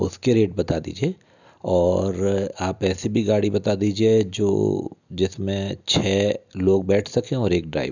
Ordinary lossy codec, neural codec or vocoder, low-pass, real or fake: none; none; 7.2 kHz; real